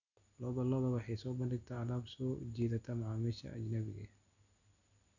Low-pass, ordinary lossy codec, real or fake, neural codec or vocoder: 7.2 kHz; none; real; none